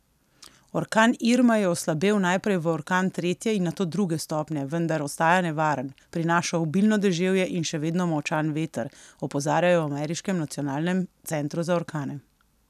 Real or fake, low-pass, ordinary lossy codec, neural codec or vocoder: real; 14.4 kHz; none; none